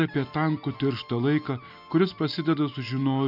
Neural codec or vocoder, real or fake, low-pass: none; real; 5.4 kHz